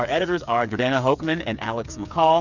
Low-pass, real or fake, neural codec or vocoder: 7.2 kHz; fake; codec, 16 kHz, 8 kbps, FreqCodec, smaller model